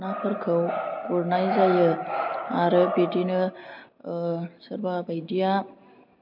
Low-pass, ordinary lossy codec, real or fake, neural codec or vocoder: 5.4 kHz; MP3, 48 kbps; real; none